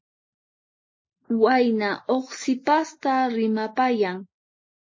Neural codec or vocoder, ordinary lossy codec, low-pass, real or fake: none; MP3, 32 kbps; 7.2 kHz; real